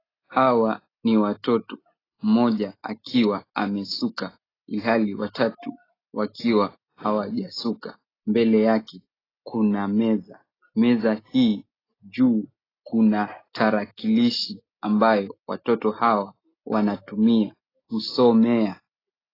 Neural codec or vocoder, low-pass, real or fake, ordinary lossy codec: none; 5.4 kHz; real; AAC, 24 kbps